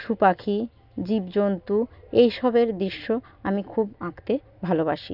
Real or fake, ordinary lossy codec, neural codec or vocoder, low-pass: real; none; none; 5.4 kHz